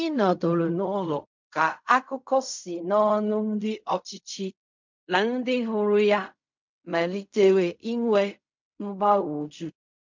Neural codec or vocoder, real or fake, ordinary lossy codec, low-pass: codec, 16 kHz in and 24 kHz out, 0.4 kbps, LongCat-Audio-Codec, fine tuned four codebook decoder; fake; MP3, 64 kbps; 7.2 kHz